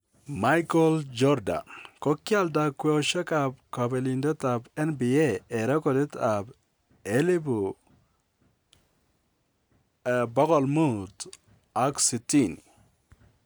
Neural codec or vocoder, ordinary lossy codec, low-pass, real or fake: none; none; none; real